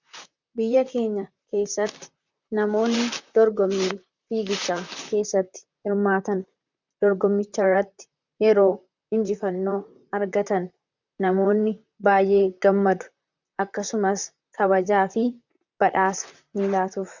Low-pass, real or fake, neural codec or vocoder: 7.2 kHz; fake; vocoder, 44.1 kHz, 128 mel bands, Pupu-Vocoder